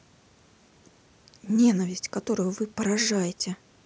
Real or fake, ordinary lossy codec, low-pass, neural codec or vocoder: real; none; none; none